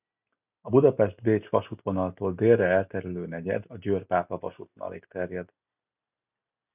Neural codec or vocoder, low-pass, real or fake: none; 3.6 kHz; real